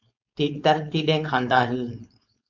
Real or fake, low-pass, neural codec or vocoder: fake; 7.2 kHz; codec, 16 kHz, 4.8 kbps, FACodec